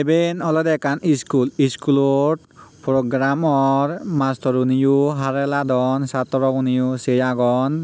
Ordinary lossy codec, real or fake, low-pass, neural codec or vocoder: none; real; none; none